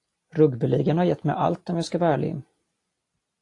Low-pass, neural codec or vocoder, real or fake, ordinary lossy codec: 10.8 kHz; none; real; AAC, 48 kbps